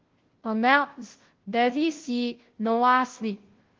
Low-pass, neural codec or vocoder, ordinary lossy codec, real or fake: 7.2 kHz; codec, 16 kHz, 0.5 kbps, FunCodec, trained on Chinese and English, 25 frames a second; Opus, 16 kbps; fake